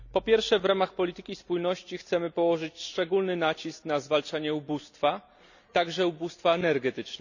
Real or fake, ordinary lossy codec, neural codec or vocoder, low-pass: real; none; none; 7.2 kHz